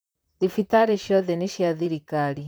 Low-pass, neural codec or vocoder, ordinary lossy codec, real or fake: none; vocoder, 44.1 kHz, 128 mel bands, Pupu-Vocoder; none; fake